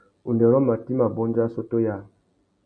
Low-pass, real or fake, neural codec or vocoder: 9.9 kHz; real; none